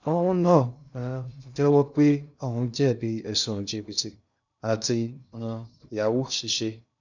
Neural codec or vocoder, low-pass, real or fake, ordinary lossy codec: codec, 16 kHz in and 24 kHz out, 0.6 kbps, FocalCodec, streaming, 2048 codes; 7.2 kHz; fake; none